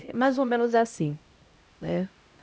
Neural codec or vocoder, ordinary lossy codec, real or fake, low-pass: codec, 16 kHz, 0.5 kbps, X-Codec, HuBERT features, trained on LibriSpeech; none; fake; none